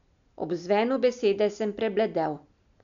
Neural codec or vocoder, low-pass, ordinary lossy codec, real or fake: none; 7.2 kHz; none; real